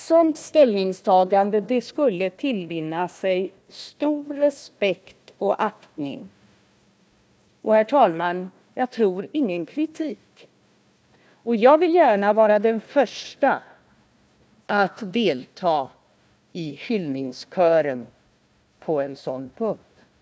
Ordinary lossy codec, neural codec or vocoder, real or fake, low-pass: none; codec, 16 kHz, 1 kbps, FunCodec, trained on Chinese and English, 50 frames a second; fake; none